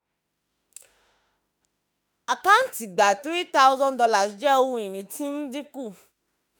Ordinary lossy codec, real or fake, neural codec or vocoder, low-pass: none; fake; autoencoder, 48 kHz, 32 numbers a frame, DAC-VAE, trained on Japanese speech; none